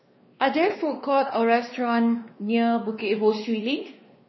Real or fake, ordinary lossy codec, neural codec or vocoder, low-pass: fake; MP3, 24 kbps; codec, 16 kHz, 2 kbps, X-Codec, WavLM features, trained on Multilingual LibriSpeech; 7.2 kHz